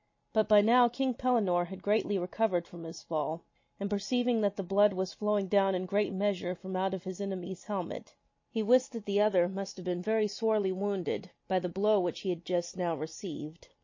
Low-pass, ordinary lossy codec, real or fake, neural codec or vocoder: 7.2 kHz; MP3, 32 kbps; real; none